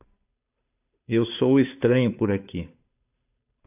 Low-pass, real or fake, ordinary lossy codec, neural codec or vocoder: 3.6 kHz; fake; AAC, 32 kbps; codec, 16 kHz, 2 kbps, FunCodec, trained on Chinese and English, 25 frames a second